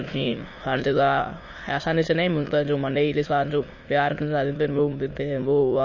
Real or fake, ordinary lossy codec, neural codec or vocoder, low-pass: fake; MP3, 32 kbps; autoencoder, 22.05 kHz, a latent of 192 numbers a frame, VITS, trained on many speakers; 7.2 kHz